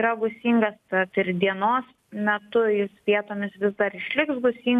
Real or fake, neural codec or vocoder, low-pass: real; none; 14.4 kHz